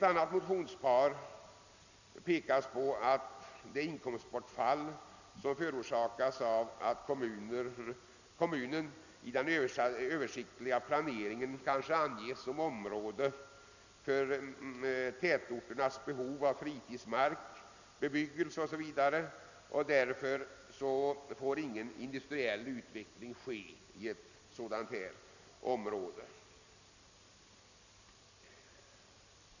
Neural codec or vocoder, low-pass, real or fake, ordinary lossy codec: none; 7.2 kHz; real; none